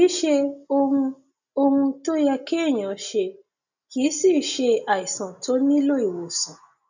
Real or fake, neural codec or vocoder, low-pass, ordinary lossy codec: real; none; 7.2 kHz; none